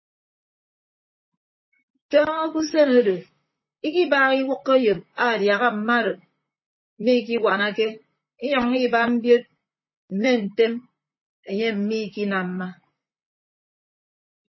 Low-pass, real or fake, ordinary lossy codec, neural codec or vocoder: 7.2 kHz; fake; MP3, 24 kbps; vocoder, 44.1 kHz, 128 mel bands, Pupu-Vocoder